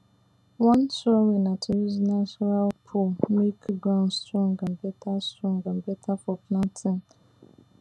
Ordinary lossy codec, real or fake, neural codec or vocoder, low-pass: none; real; none; none